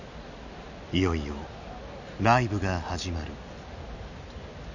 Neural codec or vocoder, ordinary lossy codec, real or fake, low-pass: none; none; real; 7.2 kHz